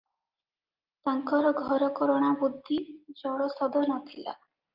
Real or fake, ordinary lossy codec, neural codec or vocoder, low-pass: real; Opus, 24 kbps; none; 5.4 kHz